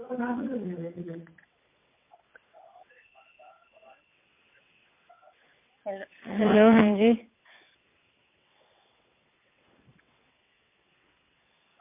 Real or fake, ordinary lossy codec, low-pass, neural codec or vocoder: fake; MP3, 24 kbps; 3.6 kHz; vocoder, 22.05 kHz, 80 mel bands, WaveNeXt